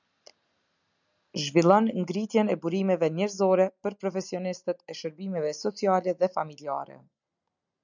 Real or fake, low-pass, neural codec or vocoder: real; 7.2 kHz; none